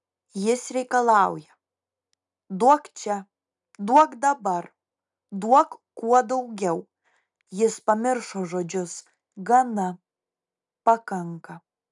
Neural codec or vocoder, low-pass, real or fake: none; 10.8 kHz; real